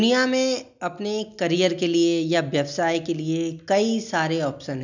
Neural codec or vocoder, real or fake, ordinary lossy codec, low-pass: none; real; none; 7.2 kHz